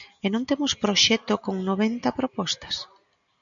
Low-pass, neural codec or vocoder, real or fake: 7.2 kHz; none; real